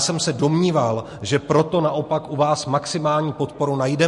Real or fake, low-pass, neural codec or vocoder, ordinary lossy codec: real; 14.4 kHz; none; MP3, 48 kbps